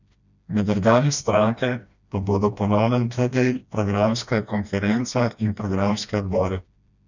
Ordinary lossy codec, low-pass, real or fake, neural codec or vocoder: none; 7.2 kHz; fake; codec, 16 kHz, 1 kbps, FreqCodec, smaller model